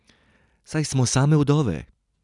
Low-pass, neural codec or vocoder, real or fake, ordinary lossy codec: 10.8 kHz; none; real; none